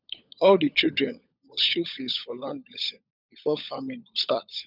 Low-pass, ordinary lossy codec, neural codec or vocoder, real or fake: 5.4 kHz; none; codec, 16 kHz, 16 kbps, FunCodec, trained on LibriTTS, 50 frames a second; fake